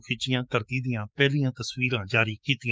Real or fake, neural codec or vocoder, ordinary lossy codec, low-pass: fake; codec, 16 kHz, 6 kbps, DAC; none; none